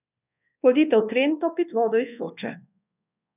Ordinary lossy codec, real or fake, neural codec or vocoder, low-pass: none; fake; codec, 24 kHz, 1.2 kbps, DualCodec; 3.6 kHz